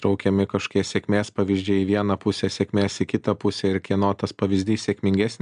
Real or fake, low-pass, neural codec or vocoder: real; 9.9 kHz; none